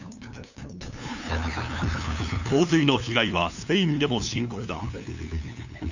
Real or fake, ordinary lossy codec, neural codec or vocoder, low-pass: fake; none; codec, 16 kHz, 2 kbps, FunCodec, trained on LibriTTS, 25 frames a second; 7.2 kHz